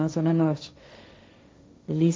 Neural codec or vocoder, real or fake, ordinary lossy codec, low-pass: codec, 16 kHz, 1.1 kbps, Voila-Tokenizer; fake; none; none